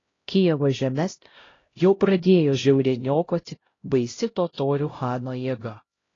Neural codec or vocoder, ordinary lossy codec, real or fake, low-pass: codec, 16 kHz, 0.5 kbps, X-Codec, HuBERT features, trained on LibriSpeech; AAC, 32 kbps; fake; 7.2 kHz